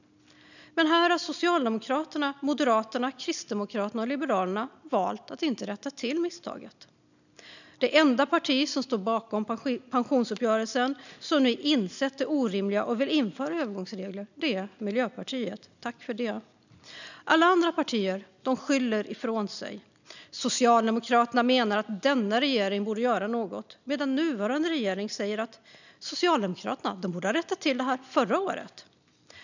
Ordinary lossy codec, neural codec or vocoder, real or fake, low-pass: none; none; real; 7.2 kHz